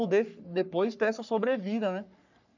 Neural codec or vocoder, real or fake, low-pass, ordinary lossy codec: codec, 44.1 kHz, 3.4 kbps, Pupu-Codec; fake; 7.2 kHz; none